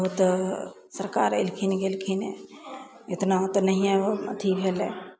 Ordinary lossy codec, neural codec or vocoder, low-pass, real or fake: none; none; none; real